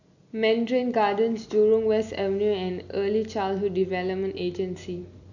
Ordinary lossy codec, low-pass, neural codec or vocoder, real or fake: none; 7.2 kHz; none; real